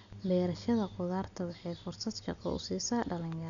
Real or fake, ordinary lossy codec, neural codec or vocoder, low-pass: real; none; none; 7.2 kHz